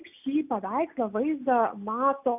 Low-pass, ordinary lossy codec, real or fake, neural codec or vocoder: 3.6 kHz; AAC, 32 kbps; real; none